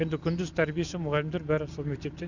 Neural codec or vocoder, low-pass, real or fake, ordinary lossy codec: none; 7.2 kHz; real; none